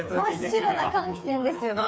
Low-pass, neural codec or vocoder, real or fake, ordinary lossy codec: none; codec, 16 kHz, 4 kbps, FreqCodec, smaller model; fake; none